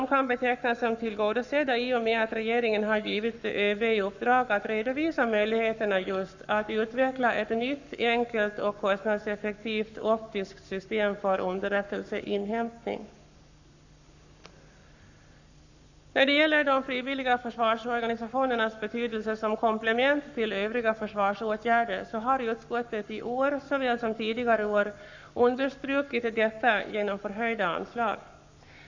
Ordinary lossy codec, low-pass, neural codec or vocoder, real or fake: none; 7.2 kHz; codec, 44.1 kHz, 7.8 kbps, Pupu-Codec; fake